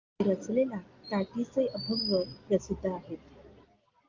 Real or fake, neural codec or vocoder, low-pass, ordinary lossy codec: real; none; 7.2 kHz; Opus, 32 kbps